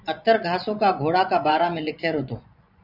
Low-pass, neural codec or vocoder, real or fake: 5.4 kHz; vocoder, 24 kHz, 100 mel bands, Vocos; fake